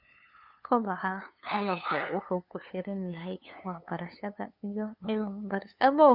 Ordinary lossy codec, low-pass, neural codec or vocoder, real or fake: none; 5.4 kHz; codec, 16 kHz, 2 kbps, FunCodec, trained on LibriTTS, 25 frames a second; fake